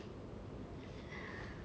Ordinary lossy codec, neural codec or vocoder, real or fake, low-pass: none; none; real; none